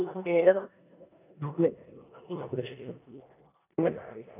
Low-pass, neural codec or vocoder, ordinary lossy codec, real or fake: 3.6 kHz; codec, 16 kHz in and 24 kHz out, 0.9 kbps, LongCat-Audio-Codec, four codebook decoder; none; fake